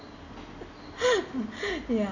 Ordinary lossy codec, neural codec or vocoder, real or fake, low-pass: none; none; real; 7.2 kHz